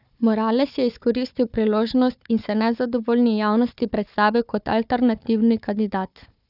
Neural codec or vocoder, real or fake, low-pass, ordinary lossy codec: codec, 16 kHz, 4 kbps, FunCodec, trained on Chinese and English, 50 frames a second; fake; 5.4 kHz; none